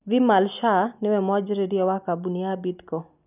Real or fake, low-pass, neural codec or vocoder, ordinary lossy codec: real; 3.6 kHz; none; none